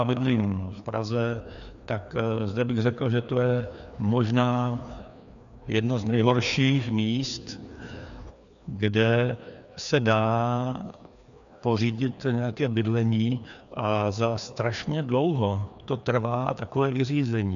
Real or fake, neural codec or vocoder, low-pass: fake; codec, 16 kHz, 2 kbps, FreqCodec, larger model; 7.2 kHz